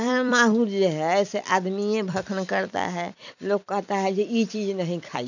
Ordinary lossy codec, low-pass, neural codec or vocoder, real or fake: none; 7.2 kHz; vocoder, 44.1 kHz, 128 mel bands every 256 samples, BigVGAN v2; fake